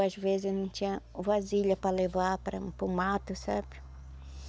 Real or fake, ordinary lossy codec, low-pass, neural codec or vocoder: real; none; none; none